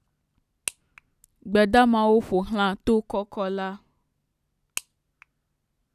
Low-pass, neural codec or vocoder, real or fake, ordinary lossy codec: 14.4 kHz; none; real; none